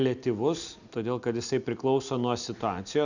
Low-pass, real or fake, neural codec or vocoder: 7.2 kHz; real; none